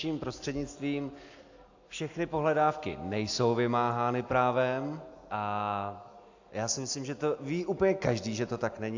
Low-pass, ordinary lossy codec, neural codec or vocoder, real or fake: 7.2 kHz; AAC, 48 kbps; none; real